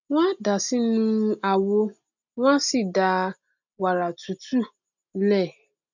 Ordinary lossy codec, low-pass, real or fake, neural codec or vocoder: none; 7.2 kHz; real; none